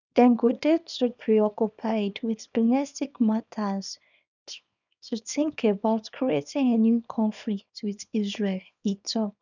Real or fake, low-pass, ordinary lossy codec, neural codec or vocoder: fake; 7.2 kHz; none; codec, 24 kHz, 0.9 kbps, WavTokenizer, small release